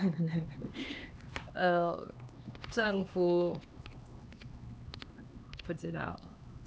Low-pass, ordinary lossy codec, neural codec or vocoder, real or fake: none; none; codec, 16 kHz, 2 kbps, X-Codec, HuBERT features, trained on LibriSpeech; fake